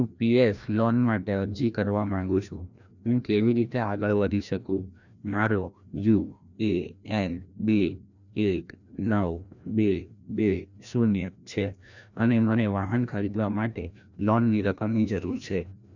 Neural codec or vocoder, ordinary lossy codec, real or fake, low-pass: codec, 16 kHz, 1 kbps, FreqCodec, larger model; none; fake; 7.2 kHz